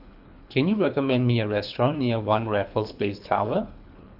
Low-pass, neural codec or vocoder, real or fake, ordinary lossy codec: 5.4 kHz; codec, 24 kHz, 3 kbps, HILCodec; fake; AAC, 48 kbps